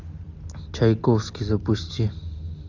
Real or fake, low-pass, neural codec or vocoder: real; 7.2 kHz; none